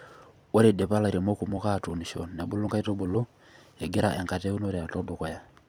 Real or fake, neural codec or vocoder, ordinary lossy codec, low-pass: fake; vocoder, 44.1 kHz, 128 mel bands every 256 samples, BigVGAN v2; none; none